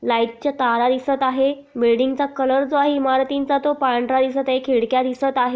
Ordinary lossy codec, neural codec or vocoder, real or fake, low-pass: none; none; real; none